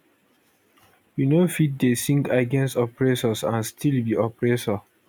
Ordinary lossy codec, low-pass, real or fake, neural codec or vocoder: none; none; real; none